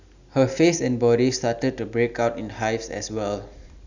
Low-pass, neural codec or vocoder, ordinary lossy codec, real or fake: 7.2 kHz; none; none; real